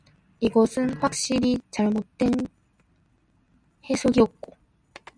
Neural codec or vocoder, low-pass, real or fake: none; 9.9 kHz; real